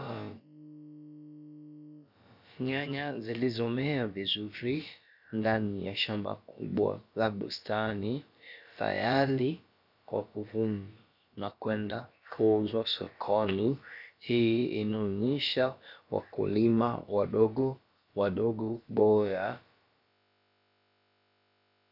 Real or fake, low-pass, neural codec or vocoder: fake; 5.4 kHz; codec, 16 kHz, about 1 kbps, DyCAST, with the encoder's durations